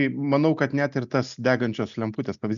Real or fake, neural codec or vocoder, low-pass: real; none; 7.2 kHz